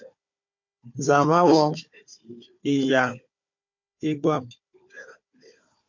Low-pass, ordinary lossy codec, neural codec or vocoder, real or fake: 7.2 kHz; MP3, 48 kbps; codec, 16 kHz, 4 kbps, FunCodec, trained on Chinese and English, 50 frames a second; fake